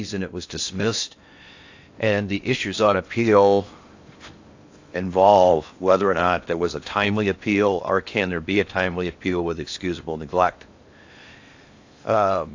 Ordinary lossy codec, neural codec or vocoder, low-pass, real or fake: AAC, 48 kbps; codec, 16 kHz in and 24 kHz out, 0.8 kbps, FocalCodec, streaming, 65536 codes; 7.2 kHz; fake